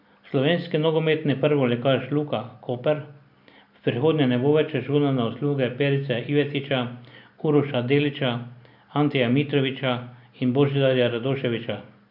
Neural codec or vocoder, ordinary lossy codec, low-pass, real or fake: none; none; 5.4 kHz; real